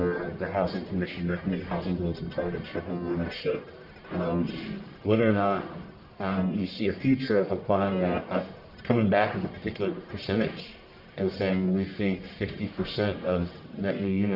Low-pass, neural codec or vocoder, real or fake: 5.4 kHz; codec, 44.1 kHz, 1.7 kbps, Pupu-Codec; fake